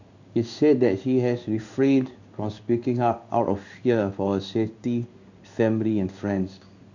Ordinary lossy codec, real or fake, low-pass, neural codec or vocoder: none; fake; 7.2 kHz; codec, 16 kHz in and 24 kHz out, 1 kbps, XY-Tokenizer